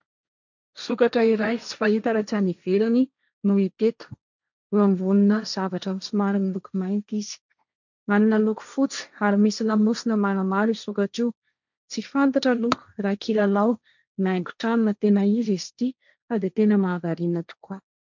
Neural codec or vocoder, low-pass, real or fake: codec, 16 kHz, 1.1 kbps, Voila-Tokenizer; 7.2 kHz; fake